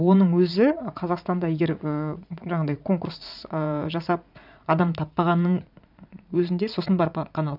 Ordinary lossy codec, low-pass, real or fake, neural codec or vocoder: none; 5.4 kHz; fake; vocoder, 44.1 kHz, 80 mel bands, Vocos